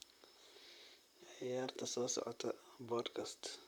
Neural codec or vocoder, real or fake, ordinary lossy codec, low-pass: vocoder, 44.1 kHz, 128 mel bands, Pupu-Vocoder; fake; none; none